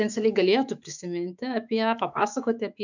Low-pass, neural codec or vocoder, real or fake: 7.2 kHz; autoencoder, 48 kHz, 128 numbers a frame, DAC-VAE, trained on Japanese speech; fake